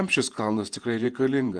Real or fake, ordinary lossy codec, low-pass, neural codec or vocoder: fake; Opus, 24 kbps; 9.9 kHz; vocoder, 48 kHz, 128 mel bands, Vocos